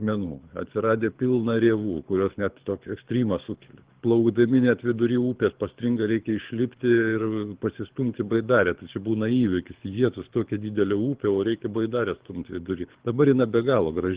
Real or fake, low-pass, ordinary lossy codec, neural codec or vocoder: fake; 3.6 kHz; Opus, 16 kbps; codec, 24 kHz, 6 kbps, HILCodec